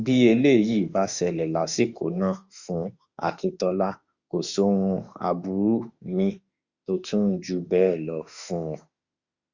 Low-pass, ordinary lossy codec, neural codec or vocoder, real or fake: 7.2 kHz; Opus, 64 kbps; autoencoder, 48 kHz, 32 numbers a frame, DAC-VAE, trained on Japanese speech; fake